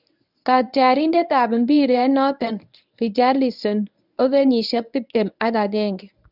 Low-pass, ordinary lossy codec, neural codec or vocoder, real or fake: 5.4 kHz; none; codec, 24 kHz, 0.9 kbps, WavTokenizer, medium speech release version 1; fake